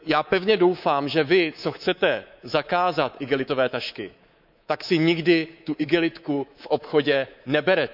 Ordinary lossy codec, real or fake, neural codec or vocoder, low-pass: none; fake; codec, 24 kHz, 3.1 kbps, DualCodec; 5.4 kHz